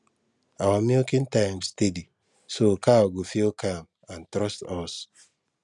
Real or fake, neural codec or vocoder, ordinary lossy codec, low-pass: real; none; none; 10.8 kHz